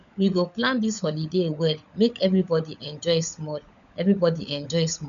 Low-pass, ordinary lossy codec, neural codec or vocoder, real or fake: 7.2 kHz; none; codec, 16 kHz, 16 kbps, FunCodec, trained on LibriTTS, 50 frames a second; fake